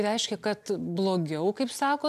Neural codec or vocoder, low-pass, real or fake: none; 14.4 kHz; real